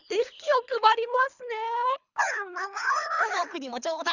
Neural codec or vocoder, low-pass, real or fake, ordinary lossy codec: codec, 24 kHz, 3 kbps, HILCodec; 7.2 kHz; fake; none